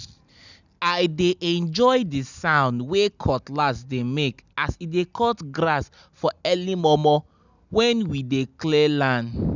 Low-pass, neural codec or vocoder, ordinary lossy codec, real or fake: 7.2 kHz; none; none; real